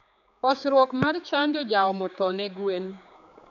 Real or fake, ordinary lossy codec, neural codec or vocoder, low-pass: fake; none; codec, 16 kHz, 4 kbps, X-Codec, HuBERT features, trained on general audio; 7.2 kHz